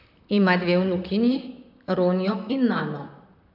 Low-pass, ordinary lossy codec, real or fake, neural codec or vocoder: 5.4 kHz; none; fake; vocoder, 44.1 kHz, 128 mel bands, Pupu-Vocoder